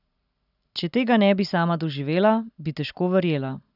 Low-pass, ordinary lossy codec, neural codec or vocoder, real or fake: 5.4 kHz; none; none; real